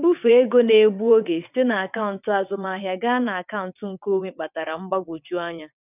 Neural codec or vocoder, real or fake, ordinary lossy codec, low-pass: vocoder, 22.05 kHz, 80 mel bands, WaveNeXt; fake; none; 3.6 kHz